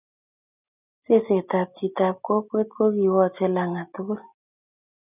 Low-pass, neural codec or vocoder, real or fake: 3.6 kHz; none; real